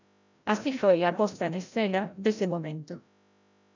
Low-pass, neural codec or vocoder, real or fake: 7.2 kHz; codec, 16 kHz, 0.5 kbps, FreqCodec, larger model; fake